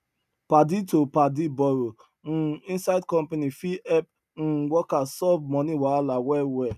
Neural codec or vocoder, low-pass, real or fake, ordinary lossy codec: none; 14.4 kHz; real; none